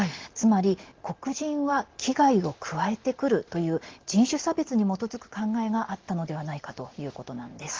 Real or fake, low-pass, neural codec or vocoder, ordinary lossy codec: real; 7.2 kHz; none; Opus, 16 kbps